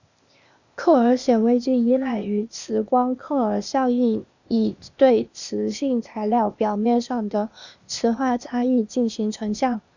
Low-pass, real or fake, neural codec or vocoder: 7.2 kHz; fake; codec, 16 kHz, 0.8 kbps, ZipCodec